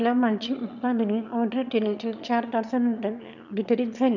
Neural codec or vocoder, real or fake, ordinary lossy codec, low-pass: autoencoder, 22.05 kHz, a latent of 192 numbers a frame, VITS, trained on one speaker; fake; none; 7.2 kHz